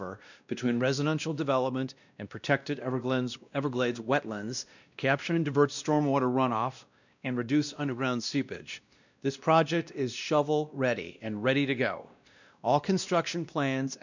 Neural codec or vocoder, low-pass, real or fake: codec, 16 kHz, 1 kbps, X-Codec, WavLM features, trained on Multilingual LibriSpeech; 7.2 kHz; fake